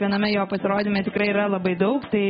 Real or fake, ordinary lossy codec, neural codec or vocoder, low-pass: real; AAC, 16 kbps; none; 19.8 kHz